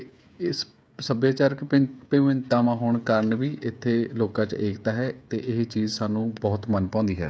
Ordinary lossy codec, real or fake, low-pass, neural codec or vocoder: none; fake; none; codec, 16 kHz, 16 kbps, FreqCodec, smaller model